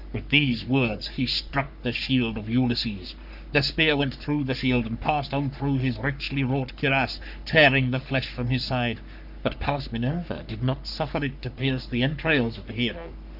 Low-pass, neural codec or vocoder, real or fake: 5.4 kHz; codec, 44.1 kHz, 3.4 kbps, Pupu-Codec; fake